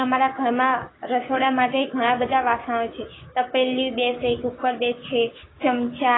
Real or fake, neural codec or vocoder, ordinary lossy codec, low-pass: fake; codec, 16 kHz, 6 kbps, DAC; AAC, 16 kbps; 7.2 kHz